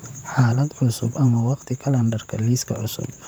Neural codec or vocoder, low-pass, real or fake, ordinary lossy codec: vocoder, 44.1 kHz, 128 mel bands, Pupu-Vocoder; none; fake; none